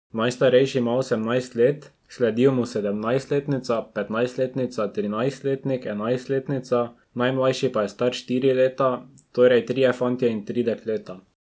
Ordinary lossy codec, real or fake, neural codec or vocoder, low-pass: none; real; none; none